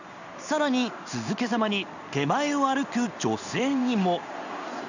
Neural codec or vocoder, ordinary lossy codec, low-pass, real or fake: codec, 16 kHz in and 24 kHz out, 1 kbps, XY-Tokenizer; none; 7.2 kHz; fake